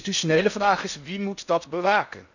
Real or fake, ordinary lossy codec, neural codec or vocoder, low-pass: fake; none; codec, 16 kHz in and 24 kHz out, 0.8 kbps, FocalCodec, streaming, 65536 codes; 7.2 kHz